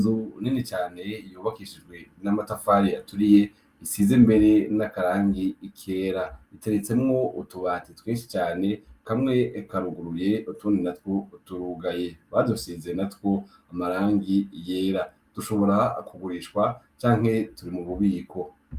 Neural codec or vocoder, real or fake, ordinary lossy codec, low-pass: none; real; Opus, 32 kbps; 14.4 kHz